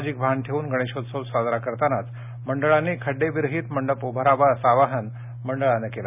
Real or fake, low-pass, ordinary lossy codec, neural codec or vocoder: real; 3.6 kHz; none; none